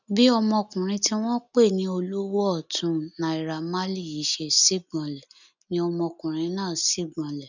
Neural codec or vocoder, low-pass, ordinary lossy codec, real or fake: none; 7.2 kHz; none; real